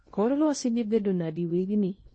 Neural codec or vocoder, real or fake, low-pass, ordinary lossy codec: codec, 16 kHz in and 24 kHz out, 0.6 kbps, FocalCodec, streaming, 2048 codes; fake; 9.9 kHz; MP3, 32 kbps